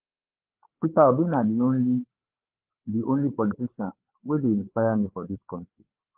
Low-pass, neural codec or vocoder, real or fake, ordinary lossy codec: 3.6 kHz; codec, 16 kHz, 8 kbps, FreqCodec, larger model; fake; Opus, 16 kbps